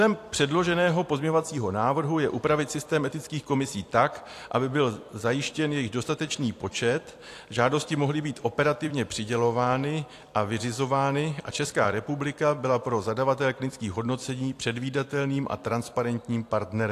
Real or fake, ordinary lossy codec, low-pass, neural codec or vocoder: fake; AAC, 64 kbps; 14.4 kHz; vocoder, 44.1 kHz, 128 mel bands every 256 samples, BigVGAN v2